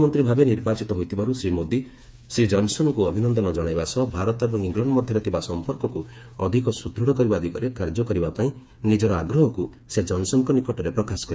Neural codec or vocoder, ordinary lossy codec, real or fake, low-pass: codec, 16 kHz, 4 kbps, FreqCodec, smaller model; none; fake; none